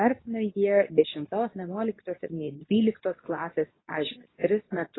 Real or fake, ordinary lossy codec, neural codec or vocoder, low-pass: fake; AAC, 16 kbps; codec, 24 kHz, 0.9 kbps, WavTokenizer, medium speech release version 2; 7.2 kHz